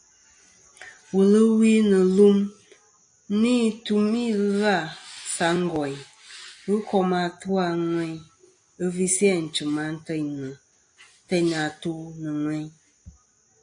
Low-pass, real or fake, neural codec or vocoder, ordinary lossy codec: 9.9 kHz; real; none; AAC, 64 kbps